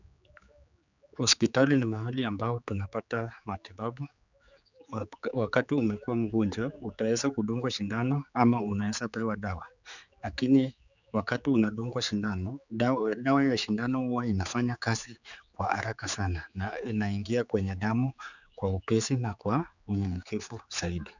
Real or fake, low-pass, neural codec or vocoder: fake; 7.2 kHz; codec, 16 kHz, 4 kbps, X-Codec, HuBERT features, trained on general audio